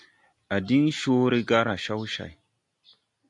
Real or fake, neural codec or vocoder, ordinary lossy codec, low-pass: real; none; AAC, 64 kbps; 10.8 kHz